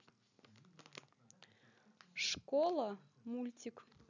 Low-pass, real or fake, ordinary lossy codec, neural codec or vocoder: 7.2 kHz; real; none; none